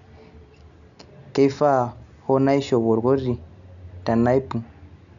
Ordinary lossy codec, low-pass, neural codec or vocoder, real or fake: none; 7.2 kHz; none; real